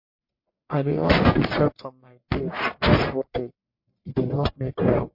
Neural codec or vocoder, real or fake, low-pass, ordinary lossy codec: codec, 44.1 kHz, 1.7 kbps, Pupu-Codec; fake; 5.4 kHz; MP3, 32 kbps